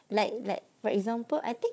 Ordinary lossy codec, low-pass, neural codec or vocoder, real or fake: none; none; codec, 16 kHz, 4 kbps, FunCodec, trained on Chinese and English, 50 frames a second; fake